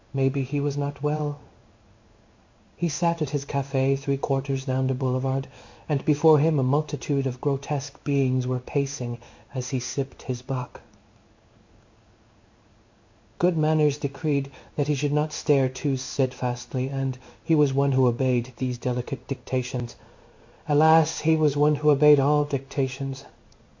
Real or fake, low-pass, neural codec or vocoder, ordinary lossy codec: fake; 7.2 kHz; codec, 16 kHz in and 24 kHz out, 1 kbps, XY-Tokenizer; MP3, 48 kbps